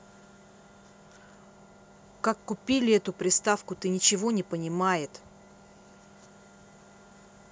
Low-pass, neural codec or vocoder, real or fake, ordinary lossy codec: none; none; real; none